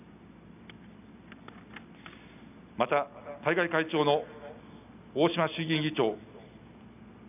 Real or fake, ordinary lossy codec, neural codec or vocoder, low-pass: real; none; none; 3.6 kHz